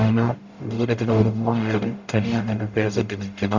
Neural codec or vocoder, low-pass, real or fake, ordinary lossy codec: codec, 44.1 kHz, 0.9 kbps, DAC; 7.2 kHz; fake; Opus, 64 kbps